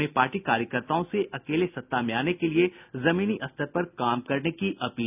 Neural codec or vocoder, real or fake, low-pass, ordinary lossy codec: none; real; 3.6 kHz; none